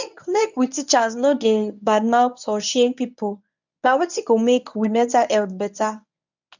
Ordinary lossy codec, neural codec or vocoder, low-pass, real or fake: none; codec, 24 kHz, 0.9 kbps, WavTokenizer, medium speech release version 1; 7.2 kHz; fake